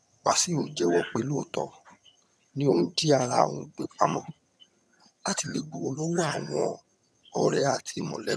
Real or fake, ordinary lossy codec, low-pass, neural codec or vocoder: fake; none; none; vocoder, 22.05 kHz, 80 mel bands, HiFi-GAN